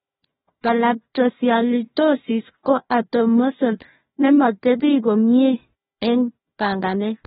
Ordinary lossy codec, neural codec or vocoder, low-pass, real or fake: AAC, 16 kbps; codec, 16 kHz, 1 kbps, FunCodec, trained on Chinese and English, 50 frames a second; 7.2 kHz; fake